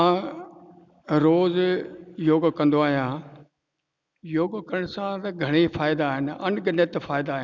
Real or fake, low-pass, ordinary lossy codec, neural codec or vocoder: real; none; none; none